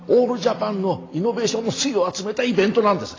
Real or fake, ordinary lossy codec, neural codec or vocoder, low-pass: real; AAC, 32 kbps; none; 7.2 kHz